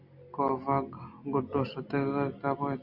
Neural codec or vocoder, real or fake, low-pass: none; real; 5.4 kHz